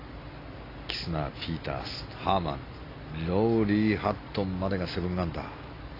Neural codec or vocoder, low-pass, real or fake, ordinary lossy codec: none; 5.4 kHz; real; AAC, 24 kbps